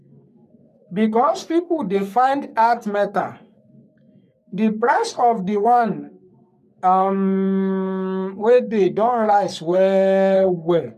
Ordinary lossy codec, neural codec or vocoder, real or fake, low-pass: none; codec, 44.1 kHz, 3.4 kbps, Pupu-Codec; fake; 14.4 kHz